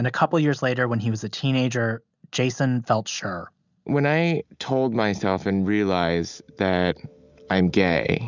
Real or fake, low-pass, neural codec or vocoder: real; 7.2 kHz; none